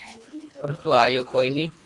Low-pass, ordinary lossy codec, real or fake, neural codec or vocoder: 10.8 kHz; AAC, 64 kbps; fake; codec, 24 kHz, 1.5 kbps, HILCodec